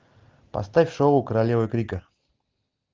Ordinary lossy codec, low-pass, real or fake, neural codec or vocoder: Opus, 32 kbps; 7.2 kHz; real; none